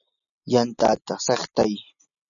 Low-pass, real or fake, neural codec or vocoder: 7.2 kHz; real; none